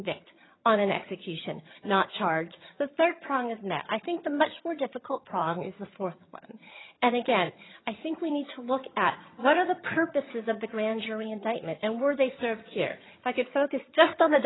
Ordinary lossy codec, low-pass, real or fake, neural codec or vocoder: AAC, 16 kbps; 7.2 kHz; fake; vocoder, 22.05 kHz, 80 mel bands, HiFi-GAN